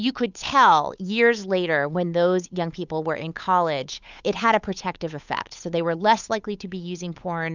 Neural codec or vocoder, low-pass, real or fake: codec, 16 kHz, 8 kbps, FunCodec, trained on Chinese and English, 25 frames a second; 7.2 kHz; fake